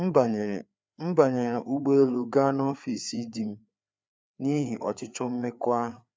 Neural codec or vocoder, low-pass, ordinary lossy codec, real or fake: codec, 16 kHz, 4 kbps, FreqCodec, larger model; none; none; fake